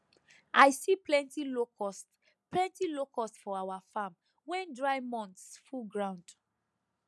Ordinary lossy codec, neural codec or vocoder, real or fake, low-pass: none; none; real; none